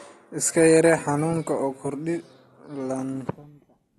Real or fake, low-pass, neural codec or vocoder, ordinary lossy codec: real; 14.4 kHz; none; AAC, 32 kbps